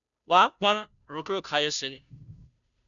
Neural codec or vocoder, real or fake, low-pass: codec, 16 kHz, 0.5 kbps, FunCodec, trained on Chinese and English, 25 frames a second; fake; 7.2 kHz